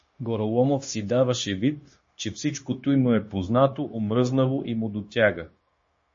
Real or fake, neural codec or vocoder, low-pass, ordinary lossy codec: fake; codec, 16 kHz, 0.9 kbps, LongCat-Audio-Codec; 7.2 kHz; MP3, 32 kbps